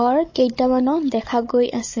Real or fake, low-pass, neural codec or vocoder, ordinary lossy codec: fake; 7.2 kHz; codec, 16 kHz, 16 kbps, FunCodec, trained on Chinese and English, 50 frames a second; MP3, 32 kbps